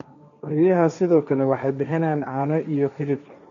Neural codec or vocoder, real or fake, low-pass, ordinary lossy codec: codec, 16 kHz, 1.1 kbps, Voila-Tokenizer; fake; 7.2 kHz; none